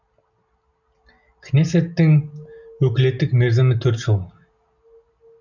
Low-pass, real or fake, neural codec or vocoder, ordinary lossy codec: 7.2 kHz; real; none; none